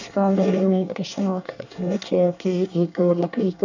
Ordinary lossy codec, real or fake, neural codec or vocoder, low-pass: none; fake; codec, 24 kHz, 1 kbps, SNAC; 7.2 kHz